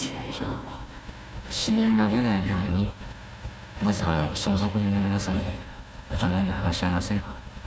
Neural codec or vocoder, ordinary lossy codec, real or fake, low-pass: codec, 16 kHz, 1 kbps, FunCodec, trained on Chinese and English, 50 frames a second; none; fake; none